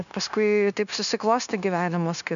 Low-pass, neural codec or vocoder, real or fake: 7.2 kHz; codec, 16 kHz, 0.9 kbps, LongCat-Audio-Codec; fake